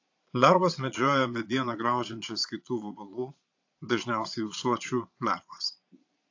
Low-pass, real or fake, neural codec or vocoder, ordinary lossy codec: 7.2 kHz; fake; vocoder, 22.05 kHz, 80 mel bands, Vocos; AAC, 48 kbps